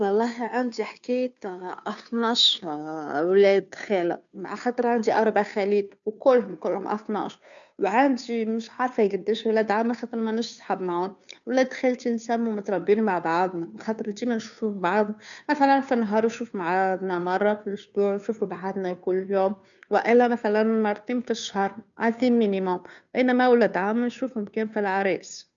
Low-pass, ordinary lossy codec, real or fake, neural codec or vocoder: 7.2 kHz; none; fake; codec, 16 kHz, 2 kbps, FunCodec, trained on Chinese and English, 25 frames a second